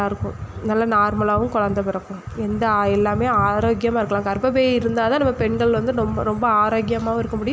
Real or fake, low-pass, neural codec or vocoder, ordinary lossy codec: real; none; none; none